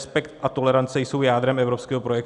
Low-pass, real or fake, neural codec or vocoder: 10.8 kHz; real; none